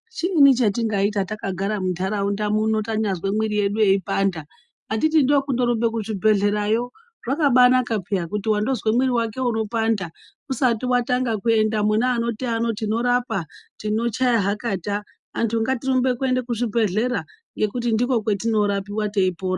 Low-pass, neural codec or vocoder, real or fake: 10.8 kHz; none; real